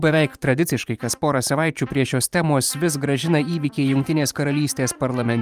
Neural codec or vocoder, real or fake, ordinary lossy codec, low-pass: vocoder, 44.1 kHz, 128 mel bands every 512 samples, BigVGAN v2; fake; Opus, 32 kbps; 14.4 kHz